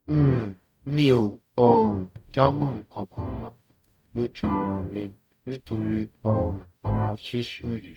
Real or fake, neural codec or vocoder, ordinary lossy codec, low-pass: fake; codec, 44.1 kHz, 0.9 kbps, DAC; none; 19.8 kHz